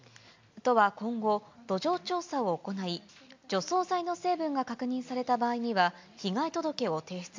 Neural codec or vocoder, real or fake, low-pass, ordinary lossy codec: none; real; 7.2 kHz; MP3, 64 kbps